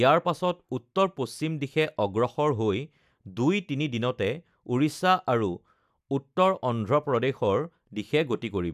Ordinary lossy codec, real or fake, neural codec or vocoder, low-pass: none; real; none; 14.4 kHz